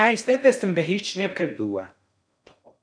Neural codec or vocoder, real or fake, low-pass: codec, 16 kHz in and 24 kHz out, 0.6 kbps, FocalCodec, streaming, 4096 codes; fake; 9.9 kHz